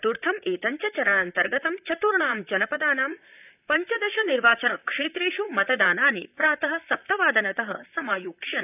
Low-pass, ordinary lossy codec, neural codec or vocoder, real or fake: 3.6 kHz; none; vocoder, 44.1 kHz, 128 mel bands, Pupu-Vocoder; fake